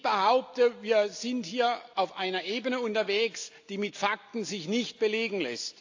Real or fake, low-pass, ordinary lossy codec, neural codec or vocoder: real; 7.2 kHz; AAC, 48 kbps; none